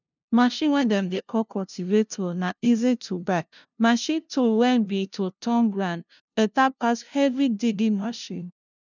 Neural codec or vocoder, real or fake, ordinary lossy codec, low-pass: codec, 16 kHz, 0.5 kbps, FunCodec, trained on LibriTTS, 25 frames a second; fake; none; 7.2 kHz